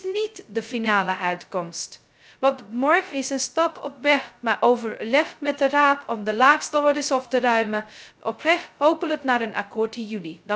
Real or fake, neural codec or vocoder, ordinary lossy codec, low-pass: fake; codec, 16 kHz, 0.2 kbps, FocalCodec; none; none